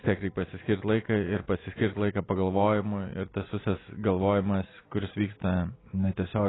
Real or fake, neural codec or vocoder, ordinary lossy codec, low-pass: real; none; AAC, 16 kbps; 7.2 kHz